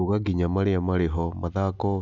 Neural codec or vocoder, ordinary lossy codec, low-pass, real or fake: none; none; 7.2 kHz; real